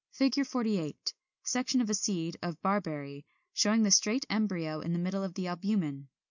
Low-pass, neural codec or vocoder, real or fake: 7.2 kHz; none; real